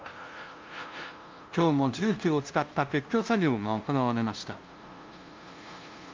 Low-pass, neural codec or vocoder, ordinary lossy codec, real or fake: 7.2 kHz; codec, 16 kHz, 0.5 kbps, FunCodec, trained on LibriTTS, 25 frames a second; Opus, 32 kbps; fake